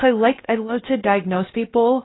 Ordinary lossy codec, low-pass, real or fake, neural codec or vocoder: AAC, 16 kbps; 7.2 kHz; fake; codec, 16 kHz, 0.3 kbps, FocalCodec